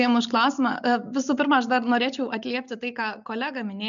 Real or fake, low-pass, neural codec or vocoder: real; 7.2 kHz; none